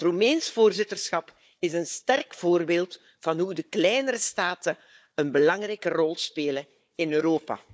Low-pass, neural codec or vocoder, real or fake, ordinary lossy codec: none; codec, 16 kHz, 4 kbps, FunCodec, trained on Chinese and English, 50 frames a second; fake; none